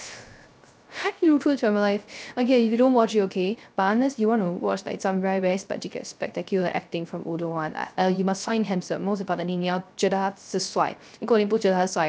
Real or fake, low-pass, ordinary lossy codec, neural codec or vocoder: fake; none; none; codec, 16 kHz, 0.3 kbps, FocalCodec